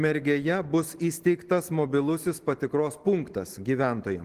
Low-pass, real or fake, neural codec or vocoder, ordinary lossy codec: 14.4 kHz; real; none; Opus, 16 kbps